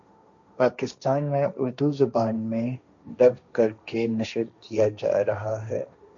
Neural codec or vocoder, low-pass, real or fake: codec, 16 kHz, 1.1 kbps, Voila-Tokenizer; 7.2 kHz; fake